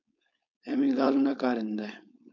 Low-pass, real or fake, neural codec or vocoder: 7.2 kHz; fake; codec, 16 kHz, 4.8 kbps, FACodec